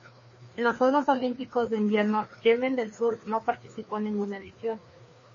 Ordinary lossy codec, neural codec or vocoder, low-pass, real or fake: MP3, 32 kbps; codec, 16 kHz, 2 kbps, FreqCodec, larger model; 7.2 kHz; fake